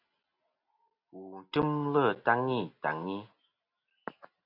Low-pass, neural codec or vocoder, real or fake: 5.4 kHz; none; real